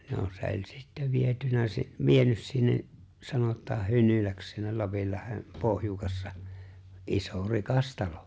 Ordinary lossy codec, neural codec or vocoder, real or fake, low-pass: none; none; real; none